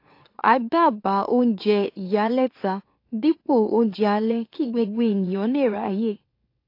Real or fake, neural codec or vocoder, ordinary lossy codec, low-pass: fake; autoencoder, 44.1 kHz, a latent of 192 numbers a frame, MeloTTS; AAC, 32 kbps; 5.4 kHz